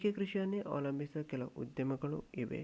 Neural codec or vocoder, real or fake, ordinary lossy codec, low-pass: none; real; none; none